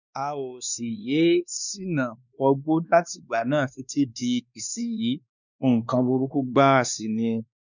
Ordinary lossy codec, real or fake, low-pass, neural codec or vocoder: none; fake; 7.2 kHz; codec, 16 kHz, 2 kbps, X-Codec, WavLM features, trained on Multilingual LibriSpeech